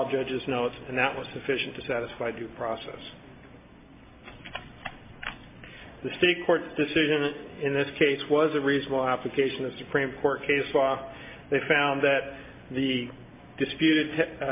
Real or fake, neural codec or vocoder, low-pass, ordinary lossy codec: real; none; 3.6 kHz; MP3, 24 kbps